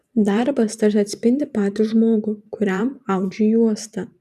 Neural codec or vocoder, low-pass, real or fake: vocoder, 44.1 kHz, 128 mel bands every 512 samples, BigVGAN v2; 14.4 kHz; fake